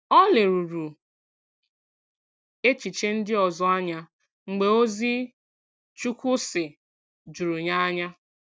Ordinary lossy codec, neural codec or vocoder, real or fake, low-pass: none; none; real; none